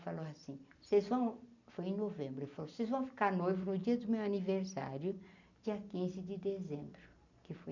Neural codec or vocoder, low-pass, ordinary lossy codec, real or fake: none; 7.2 kHz; none; real